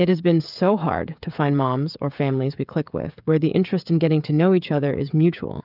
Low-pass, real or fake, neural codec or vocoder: 5.4 kHz; fake; codec, 16 kHz, 16 kbps, FreqCodec, smaller model